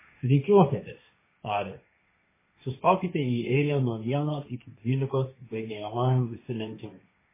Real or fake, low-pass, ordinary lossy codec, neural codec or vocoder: fake; 3.6 kHz; MP3, 16 kbps; codec, 16 kHz, 1.1 kbps, Voila-Tokenizer